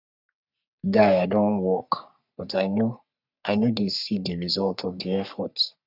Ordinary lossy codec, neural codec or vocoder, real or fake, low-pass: none; codec, 44.1 kHz, 3.4 kbps, Pupu-Codec; fake; 5.4 kHz